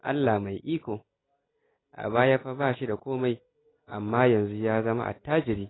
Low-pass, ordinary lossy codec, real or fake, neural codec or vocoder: 7.2 kHz; AAC, 16 kbps; real; none